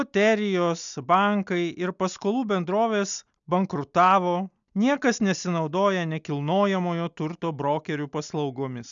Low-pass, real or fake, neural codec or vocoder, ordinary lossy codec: 7.2 kHz; real; none; MP3, 96 kbps